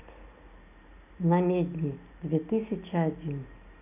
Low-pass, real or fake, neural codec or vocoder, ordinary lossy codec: 3.6 kHz; real; none; none